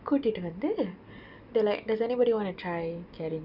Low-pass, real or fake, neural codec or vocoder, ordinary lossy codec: 5.4 kHz; real; none; none